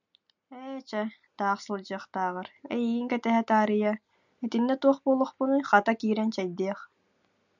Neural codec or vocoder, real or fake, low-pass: none; real; 7.2 kHz